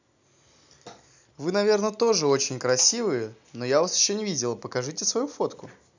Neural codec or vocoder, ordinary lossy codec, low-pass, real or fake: none; none; 7.2 kHz; real